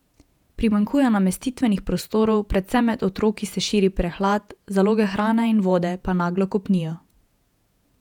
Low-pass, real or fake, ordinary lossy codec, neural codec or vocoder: 19.8 kHz; fake; none; vocoder, 48 kHz, 128 mel bands, Vocos